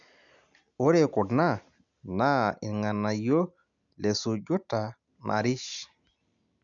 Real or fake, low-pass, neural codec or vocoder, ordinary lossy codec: real; 7.2 kHz; none; none